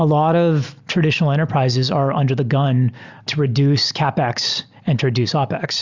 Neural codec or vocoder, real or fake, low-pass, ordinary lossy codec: none; real; 7.2 kHz; Opus, 64 kbps